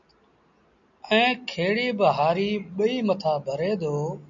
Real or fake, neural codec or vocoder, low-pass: real; none; 7.2 kHz